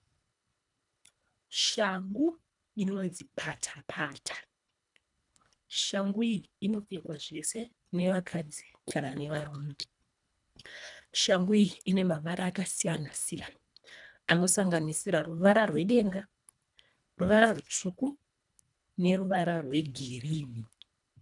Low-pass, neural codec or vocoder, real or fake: 10.8 kHz; codec, 24 kHz, 1.5 kbps, HILCodec; fake